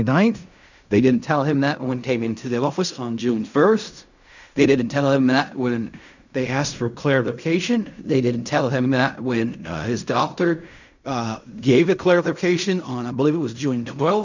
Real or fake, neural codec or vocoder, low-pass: fake; codec, 16 kHz in and 24 kHz out, 0.4 kbps, LongCat-Audio-Codec, fine tuned four codebook decoder; 7.2 kHz